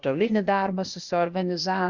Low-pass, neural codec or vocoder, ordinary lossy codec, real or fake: 7.2 kHz; codec, 16 kHz, 0.8 kbps, ZipCodec; Opus, 64 kbps; fake